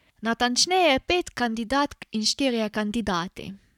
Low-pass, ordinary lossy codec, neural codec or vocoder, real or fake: 19.8 kHz; none; codec, 44.1 kHz, 7.8 kbps, Pupu-Codec; fake